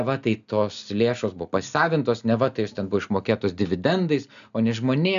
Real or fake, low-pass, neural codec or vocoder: real; 7.2 kHz; none